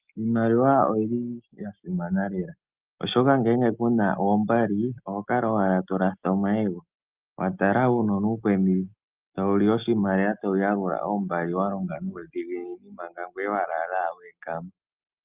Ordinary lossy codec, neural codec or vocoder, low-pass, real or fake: Opus, 24 kbps; none; 3.6 kHz; real